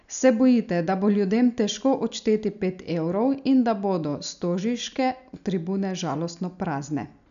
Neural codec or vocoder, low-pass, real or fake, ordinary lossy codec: none; 7.2 kHz; real; none